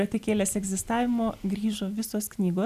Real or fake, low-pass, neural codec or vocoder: real; 14.4 kHz; none